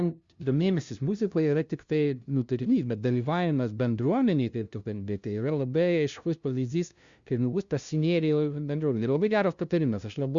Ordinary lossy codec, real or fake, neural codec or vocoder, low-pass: Opus, 64 kbps; fake; codec, 16 kHz, 0.5 kbps, FunCodec, trained on LibriTTS, 25 frames a second; 7.2 kHz